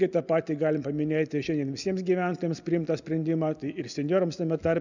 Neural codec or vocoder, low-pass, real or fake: none; 7.2 kHz; real